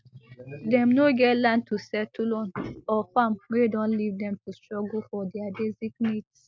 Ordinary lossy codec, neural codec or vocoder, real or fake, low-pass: none; none; real; none